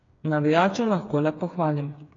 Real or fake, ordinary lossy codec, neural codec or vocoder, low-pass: fake; AAC, 32 kbps; codec, 16 kHz, 4 kbps, FreqCodec, smaller model; 7.2 kHz